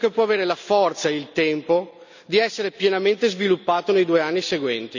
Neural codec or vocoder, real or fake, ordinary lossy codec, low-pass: none; real; none; 7.2 kHz